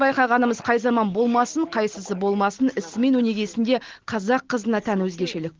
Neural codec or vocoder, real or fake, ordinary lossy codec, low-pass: none; real; Opus, 16 kbps; 7.2 kHz